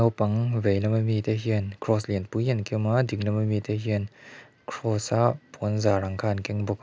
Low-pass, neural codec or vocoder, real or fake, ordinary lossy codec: none; none; real; none